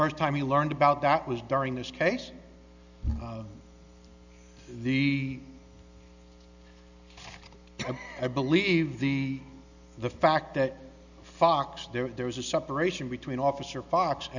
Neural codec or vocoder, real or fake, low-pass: none; real; 7.2 kHz